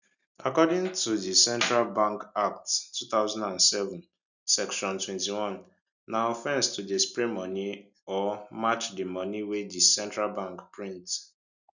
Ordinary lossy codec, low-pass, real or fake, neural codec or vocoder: none; 7.2 kHz; real; none